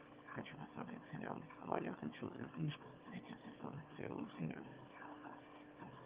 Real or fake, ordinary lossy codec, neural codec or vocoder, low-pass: fake; Opus, 32 kbps; autoencoder, 22.05 kHz, a latent of 192 numbers a frame, VITS, trained on one speaker; 3.6 kHz